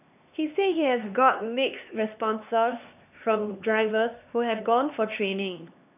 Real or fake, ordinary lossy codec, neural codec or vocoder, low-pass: fake; none; codec, 16 kHz, 2 kbps, X-Codec, HuBERT features, trained on LibriSpeech; 3.6 kHz